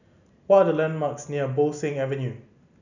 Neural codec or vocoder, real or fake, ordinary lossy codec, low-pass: none; real; none; 7.2 kHz